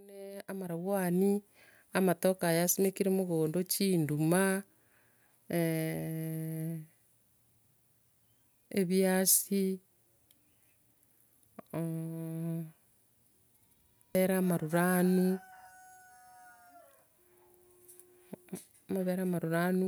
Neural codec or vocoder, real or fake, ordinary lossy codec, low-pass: none; real; none; none